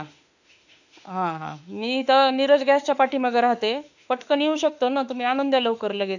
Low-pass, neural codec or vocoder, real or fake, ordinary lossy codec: 7.2 kHz; autoencoder, 48 kHz, 32 numbers a frame, DAC-VAE, trained on Japanese speech; fake; none